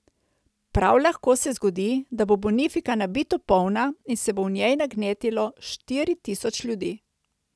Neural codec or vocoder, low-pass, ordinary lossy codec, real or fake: none; none; none; real